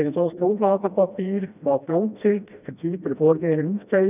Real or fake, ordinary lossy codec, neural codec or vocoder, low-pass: fake; none; codec, 16 kHz, 1 kbps, FreqCodec, smaller model; 3.6 kHz